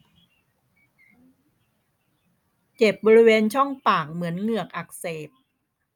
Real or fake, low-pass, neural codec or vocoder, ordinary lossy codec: real; 19.8 kHz; none; none